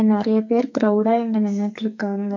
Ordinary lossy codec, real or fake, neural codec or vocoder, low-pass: none; fake; codec, 44.1 kHz, 2.6 kbps, SNAC; 7.2 kHz